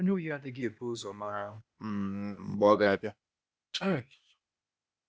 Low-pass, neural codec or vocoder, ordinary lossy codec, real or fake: none; codec, 16 kHz, 0.8 kbps, ZipCodec; none; fake